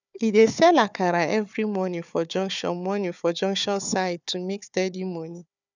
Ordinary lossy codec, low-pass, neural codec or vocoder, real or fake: none; 7.2 kHz; codec, 16 kHz, 4 kbps, FunCodec, trained on Chinese and English, 50 frames a second; fake